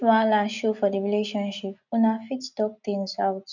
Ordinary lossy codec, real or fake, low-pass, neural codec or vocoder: none; real; 7.2 kHz; none